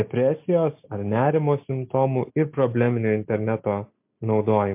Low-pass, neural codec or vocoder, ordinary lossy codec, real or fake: 3.6 kHz; none; MP3, 32 kbps; real